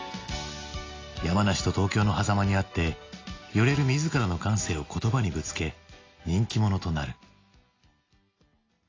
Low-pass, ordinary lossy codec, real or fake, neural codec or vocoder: 7.2 kHz; AAC, 32 kbps; real; none